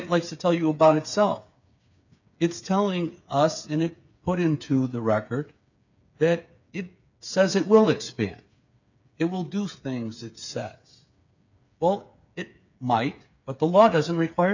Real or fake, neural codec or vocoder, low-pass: fake; codec, 16 kHz, 8 kbps, FreqCodec, smaller model; 7.2 kHz